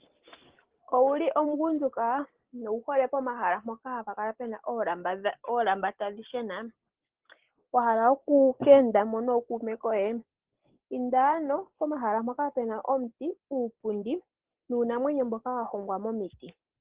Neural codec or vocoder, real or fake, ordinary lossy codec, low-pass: none; real; Opus, 16 kbps; 3.6 kHz